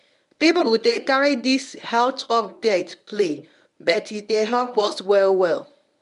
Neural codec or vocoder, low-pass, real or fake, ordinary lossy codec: codec, 24 kHz, 0.9 kbps, WavTokenizer, medium speech release version 1; 10.8 kHz; fake; none